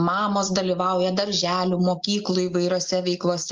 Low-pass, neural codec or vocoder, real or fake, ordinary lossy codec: 7.2 kHz; none; real; Opus, 32 kbps